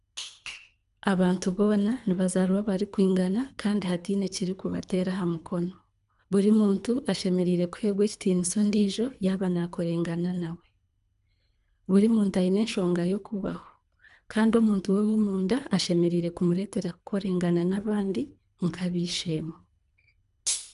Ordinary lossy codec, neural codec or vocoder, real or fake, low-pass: none; codec, 24 kHz, 3 kbps, HILCodec; fake; 10.8 kHz